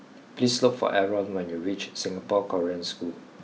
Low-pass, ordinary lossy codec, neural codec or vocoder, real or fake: none; none; none; real